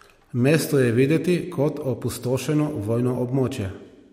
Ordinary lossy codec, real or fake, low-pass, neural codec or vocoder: MP3, 64 kbps; real; 19.8 kHz; none